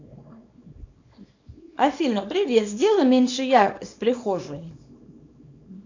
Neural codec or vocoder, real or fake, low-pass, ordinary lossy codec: codec, 24 kHz, 0.9 kbps, WavTokenizer, small release; fake; 7.2 kHz; AAC, 48 kbps